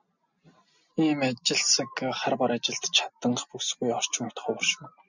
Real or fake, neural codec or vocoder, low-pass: real; none; 7.2 kHz